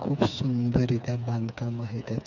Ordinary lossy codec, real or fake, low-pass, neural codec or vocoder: none; fake; 7.2 kHz; codec, 16 kHz, 4 kbps, FreqCodec, smaller model